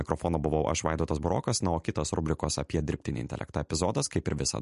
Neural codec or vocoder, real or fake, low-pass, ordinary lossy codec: none; real; 14.4 kHz; MP3, 48 kbps